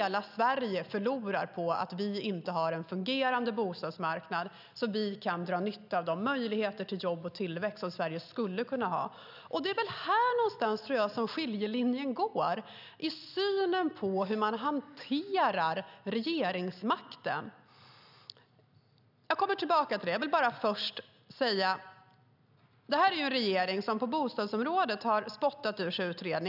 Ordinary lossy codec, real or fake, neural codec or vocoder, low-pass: none; real; none; 5.4 kHz